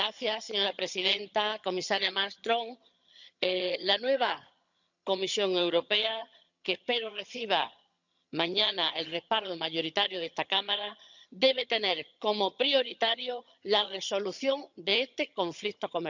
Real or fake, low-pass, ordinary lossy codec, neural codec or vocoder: fake; 7.2 kHz; none; vocoder, 22.05 kHz, 80 mel bands, HiFi-GAN